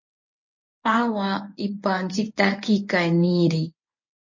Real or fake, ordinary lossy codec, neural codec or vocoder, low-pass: fake; MP3, 32 kbps; codec, 24 kHz, 0.9 kbps, WavTokenizer, medium speech release version 1; 7.2 kHz